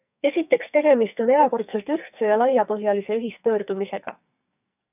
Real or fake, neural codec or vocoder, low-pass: fake; codec, 44.1 kHz, 2.6 kbps, SNAC; 3.6 kHz